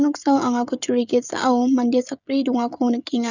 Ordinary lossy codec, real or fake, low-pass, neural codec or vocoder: none; fake; 7.2 kHz; codec, 16 kHz, 16 kbps, FreqCodec, smaller model